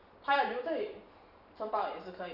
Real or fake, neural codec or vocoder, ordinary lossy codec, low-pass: fake; vocoder, 44.1 kHz, 128 mel bands every 512 samples, BigVGAN v2; none; 5.4 kHz